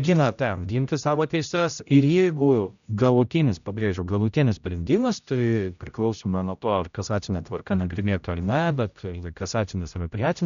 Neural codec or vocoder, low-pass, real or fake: codec, 16 kHz, 0.5 kbps, X-Codec, HuBERT features, trained on general audio; 7.2 kHz; fake